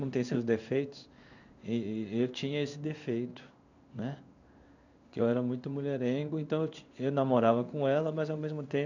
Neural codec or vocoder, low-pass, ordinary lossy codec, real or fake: codec, 16 kHz in and 24 kHz out, 1 kbps, XY-Tokenizer; 7.2 kHz; none; fake